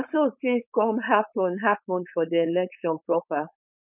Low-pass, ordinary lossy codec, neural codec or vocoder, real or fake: 3.6 kHz; none; codec, 16 kHz, 4.8 kbps, FACodec; fake